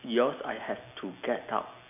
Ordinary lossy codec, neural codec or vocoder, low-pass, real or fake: none; none; 3.6 kHz; real